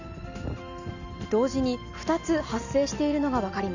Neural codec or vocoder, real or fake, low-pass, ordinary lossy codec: none; real; 7.2 kHz; none